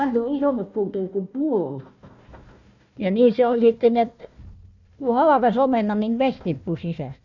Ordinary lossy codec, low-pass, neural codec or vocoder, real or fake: none; 7.2 kHz; codec, 16 kHz, 1 kbps, FunCodec, trained on Chinese and English, 50 frames a second; fake